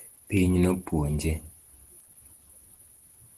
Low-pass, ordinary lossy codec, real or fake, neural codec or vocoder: 10.8 kHz; Opus, 16 kbps; real; none